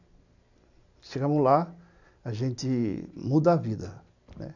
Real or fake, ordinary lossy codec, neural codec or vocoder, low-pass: real; none; none; 7.2 kHz